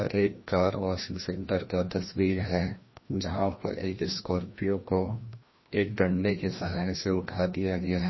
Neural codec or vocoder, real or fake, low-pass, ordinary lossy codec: codec, 16 kHz, 1 kbps, FreqCodec, larger model; fake; 7.2 kHz; MP3, 24 kbps